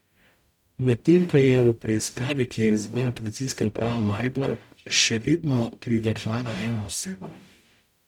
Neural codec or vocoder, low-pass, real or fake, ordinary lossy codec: codec, 44.1 kHz, 0.9 kbps, DAC; 19.8 kHz; fake; none